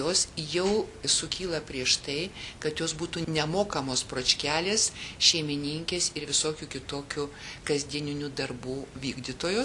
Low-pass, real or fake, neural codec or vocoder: 10.8 kHz; real; none